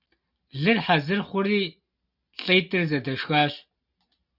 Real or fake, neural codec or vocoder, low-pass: real; none; 5.4 kHz